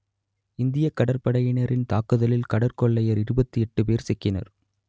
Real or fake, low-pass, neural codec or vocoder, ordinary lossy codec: real; none; none; none